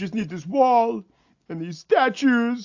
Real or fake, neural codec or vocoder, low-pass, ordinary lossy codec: real; none; 7.2 kHz; AAC, 48 kbps